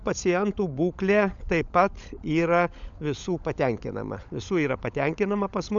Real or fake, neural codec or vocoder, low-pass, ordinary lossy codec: fake; codec, 16 kHz, 16 kbps, FunCodec, trained on LibriTTS, 50 frames a second; 7.2 kHz; MP3, 96 kbps